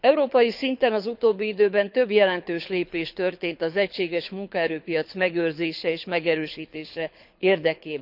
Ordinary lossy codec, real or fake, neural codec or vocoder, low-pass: none; fake; codec, 24 kHz, 6 kbps, HILCodec; 5.4 kHz